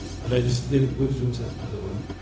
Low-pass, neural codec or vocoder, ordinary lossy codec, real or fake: none; codec, 16 kHz, 0.4 kbps, LongCat-Audio-Codec; none; fake